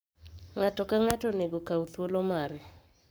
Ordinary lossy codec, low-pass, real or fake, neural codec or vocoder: none; none; fake; codec, 44.1 kHz, 7.8 kbps, Pupu-Codec